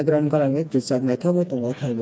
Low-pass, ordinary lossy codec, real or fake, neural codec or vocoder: none; none; fake; codec, 16 kHz, 2 kbps, FreqCodec, smaller model